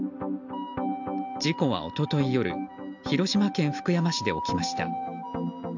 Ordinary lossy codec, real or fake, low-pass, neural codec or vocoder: none; real; 7.2 kHz; none